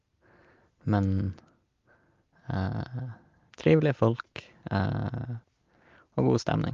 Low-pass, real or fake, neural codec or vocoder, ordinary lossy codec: 7.2 kHz; real; none; Opus, 32 kbps